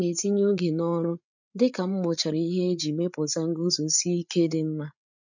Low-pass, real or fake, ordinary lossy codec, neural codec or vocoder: 7.2 kHz; fake; none; codec, 16 kHz, 8 kbps, FreqCodec, larger model